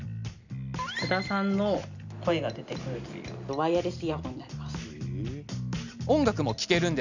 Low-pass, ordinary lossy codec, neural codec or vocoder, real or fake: 7.2 kHz; none; none; real